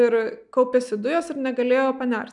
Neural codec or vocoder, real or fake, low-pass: none; real; 10.8 kHz